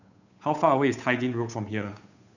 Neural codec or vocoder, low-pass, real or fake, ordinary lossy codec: codec, 16 kHz, 8 kbps, FunCodec, trained on Chinese and English, 25 frames a second; 7.2 kHz; fake; none